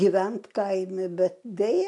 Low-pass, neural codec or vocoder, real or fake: 10.8 kHz; none; real